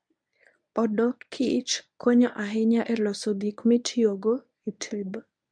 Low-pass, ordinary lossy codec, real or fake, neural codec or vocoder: 9.9 kHz; MP3, 64 kbps; fake; codec, 24 kHz, 0.9 kbps, WavTokenizer, medium speech release version 1